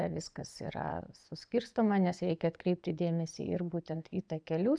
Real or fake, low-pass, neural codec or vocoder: fake; 9.9 kHz; vocoder, 24 kHz, 100 mel bands, Vocos